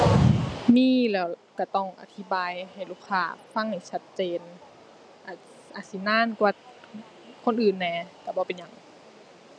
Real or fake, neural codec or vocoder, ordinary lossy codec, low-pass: real; none; none; none